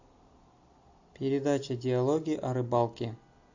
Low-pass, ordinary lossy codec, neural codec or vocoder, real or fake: 7.2 kHz; AAC, 48 kbps; none; real